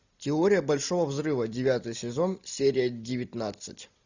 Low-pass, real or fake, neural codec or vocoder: 7.2 kHz; real; none